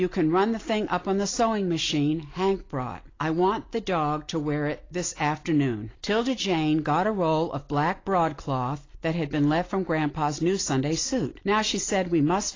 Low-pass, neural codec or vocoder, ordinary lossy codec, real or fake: 7.2 kHz; none; AAC, 32 kbps; real